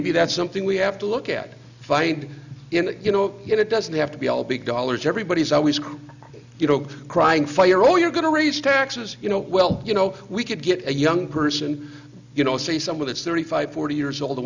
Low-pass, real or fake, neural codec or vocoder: 7.2 kHz; real; none